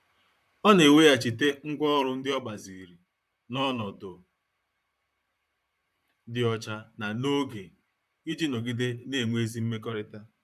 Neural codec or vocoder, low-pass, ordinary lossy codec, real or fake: vocoder, 44.1 kHz, 128 mel bands, Pupu-Vocoder; 14.4 kHz; none; fake